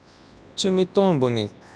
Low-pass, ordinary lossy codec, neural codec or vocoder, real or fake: none; none; codec, 24 kHz, 0.9 kbps, WavTokenizer, large speech release; fake